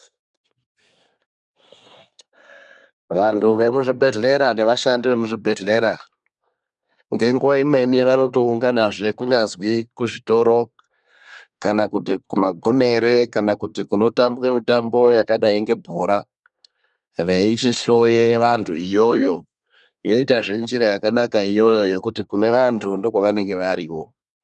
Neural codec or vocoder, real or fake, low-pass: codec, 24 kHz, 1 kbps, SNAC; fake; 10.8 kHz